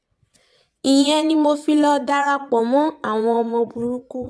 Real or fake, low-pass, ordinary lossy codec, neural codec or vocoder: fake; none; none; vocoder, 22.05 kHz, 80 mel bands, Vocos